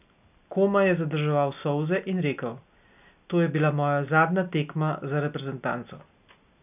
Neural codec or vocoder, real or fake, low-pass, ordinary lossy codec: none; real; 3.6 kHz; none